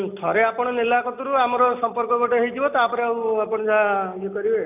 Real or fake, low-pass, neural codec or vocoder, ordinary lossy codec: real; 3.6 kHz; none; none